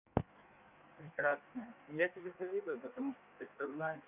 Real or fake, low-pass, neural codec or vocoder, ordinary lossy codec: fake; 3.6 kHz; codec, 16 kHz in and 24 kHz out, 1.1 kbps, FireRedTTS-2 codec; none